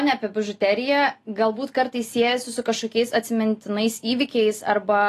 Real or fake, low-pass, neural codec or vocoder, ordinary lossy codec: real; 14.4 kHz; none; AAC, 48 kbps